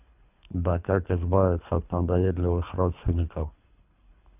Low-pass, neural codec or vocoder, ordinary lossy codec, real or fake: 3.6 kHz; codec, 24 kHz, 3 kbps, HILCodec; none; fake